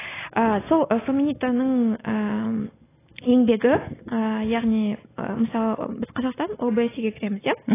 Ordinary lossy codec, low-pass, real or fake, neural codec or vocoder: AAC, 16 kbps; 3.6 kHz; real; none